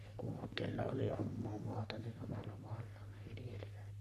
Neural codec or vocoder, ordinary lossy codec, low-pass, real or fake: codec, 44.1 kHz, 3.4 kbps, Pupu-Codec; none; 14.4 kHz; fake